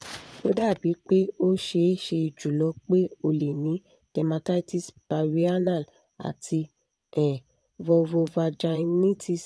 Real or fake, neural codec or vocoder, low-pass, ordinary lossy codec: fake; vocoder, 22.05 kHz, 80 mel bands, Vocos; none; none